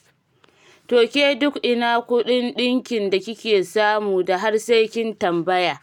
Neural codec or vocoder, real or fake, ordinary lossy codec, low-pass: none; real; none; 19.8 kHz